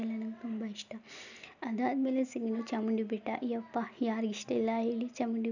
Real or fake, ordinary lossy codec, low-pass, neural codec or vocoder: real; none; 7.2 kHz; none